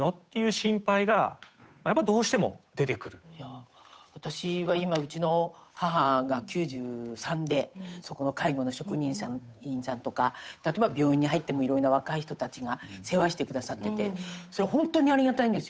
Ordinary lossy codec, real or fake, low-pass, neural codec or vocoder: none; fake; none; codec, 16 kHz, 8 kbps, FunCodec, trained on Chinese and English, 25 frames a second